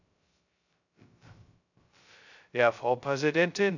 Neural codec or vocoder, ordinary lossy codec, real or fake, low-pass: codec, 16 kHz, 0.2 kbps, FocalCodec; none; fake; 7.2 kHz